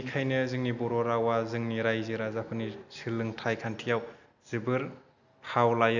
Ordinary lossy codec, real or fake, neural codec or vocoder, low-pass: none; real; none; 7.2 kHz